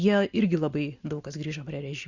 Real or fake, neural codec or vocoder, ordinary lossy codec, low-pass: real; none; Opus, 64 kbps; 7.2 kHz